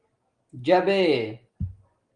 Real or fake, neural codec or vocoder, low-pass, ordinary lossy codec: real; none; 9.9 kHz; Opus, 24 kbps